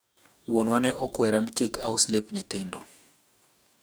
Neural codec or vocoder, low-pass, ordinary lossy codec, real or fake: codec, 44.1 kHz, 2.6 kbps, DAC; none; none; fake